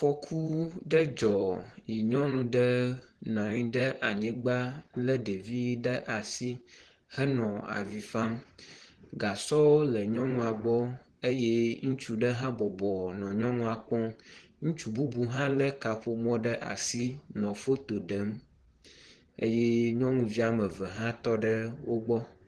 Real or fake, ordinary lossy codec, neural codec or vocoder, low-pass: fake; Opus, 16 kbps; vocoder, 44.1 kHz, 128 mel bands, Pupu-Vocoder; 10.8 kHz